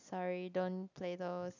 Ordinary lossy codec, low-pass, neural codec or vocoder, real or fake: none; 7.2 kHz; none; real